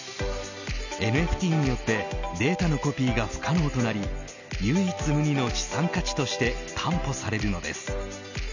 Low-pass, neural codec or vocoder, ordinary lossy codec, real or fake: 7.2 kHz; none; none; real